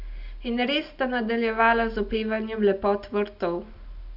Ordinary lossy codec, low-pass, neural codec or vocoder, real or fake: none; 5.4 kHz; none; real